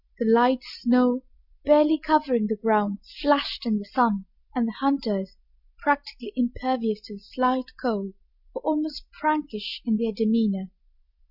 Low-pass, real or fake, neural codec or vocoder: 5.4 kHz; real; none